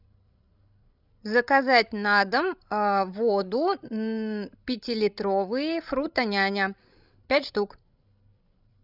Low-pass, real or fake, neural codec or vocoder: 5.4 kHz; fake; codec, 16 kHz, 16 kbps, FreqCodec, larger model